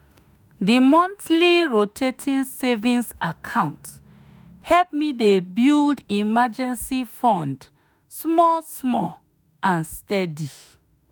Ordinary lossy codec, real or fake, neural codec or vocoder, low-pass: none; fake; autoencoder, 48 kHz, 32 numbers a frame, DAC-VAE, trained on Japanese speech; none